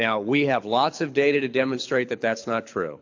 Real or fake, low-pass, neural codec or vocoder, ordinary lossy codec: fake; 7.2 kHz; codec, 24 kHz, 6 kbps, HILCodec; AAC, 48 kbps